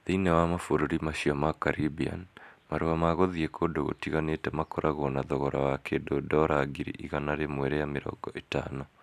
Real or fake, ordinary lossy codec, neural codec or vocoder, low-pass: real; none; none; 14.4 kHz